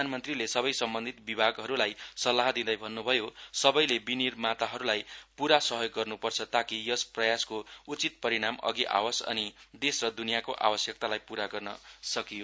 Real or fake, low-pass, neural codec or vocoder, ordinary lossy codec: real; none; none; none